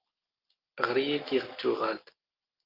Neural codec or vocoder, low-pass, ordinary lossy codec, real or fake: none; 5.4 kHz; Opus, 32 kbps; real